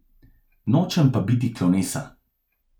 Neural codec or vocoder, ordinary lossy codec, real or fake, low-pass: none; none; real; 19.8 kHz